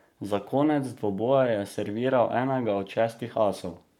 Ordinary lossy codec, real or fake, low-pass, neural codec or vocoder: none; fake; 19.8 kHz; codec, 44.1 kHz, 7.8 kbps, Pupu-Codec